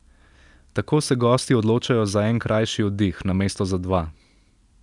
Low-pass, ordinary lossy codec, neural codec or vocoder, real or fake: 10.8 kHz; none; none; real